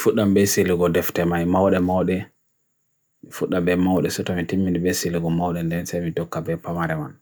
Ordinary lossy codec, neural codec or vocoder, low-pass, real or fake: none; none; none; real